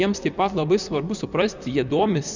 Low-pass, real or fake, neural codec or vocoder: 7.2 kHz; fake; vocoder, 44.1 kHz, 128 mel bands, Pupu-Vocoder